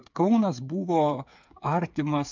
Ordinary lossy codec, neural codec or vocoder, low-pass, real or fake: MP3, 64 kbps; codec, 16 kHz, 8 kbps, FreqCodec, smaller model; 7.2 kHz; fake